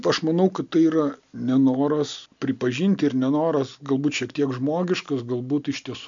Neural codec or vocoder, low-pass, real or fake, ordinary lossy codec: none; 7.2 kHz; real; MP3, 64 kbps